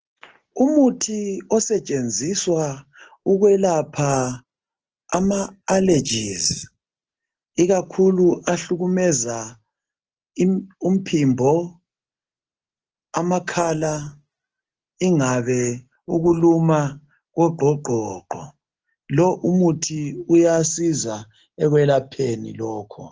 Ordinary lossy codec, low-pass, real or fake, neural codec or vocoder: Opus, 16 kbps; 7.2 kHz; real; none